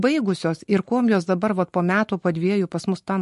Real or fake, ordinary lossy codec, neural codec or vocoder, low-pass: real; MP3, 48 kbps; none; 14.4 kHz